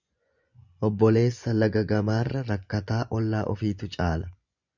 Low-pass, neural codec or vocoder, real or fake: 7.2 kHz; vocoder, 24 kHz, 100 mel bands, Vocos; fake